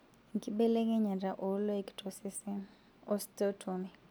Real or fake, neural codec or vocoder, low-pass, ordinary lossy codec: real; none; none; none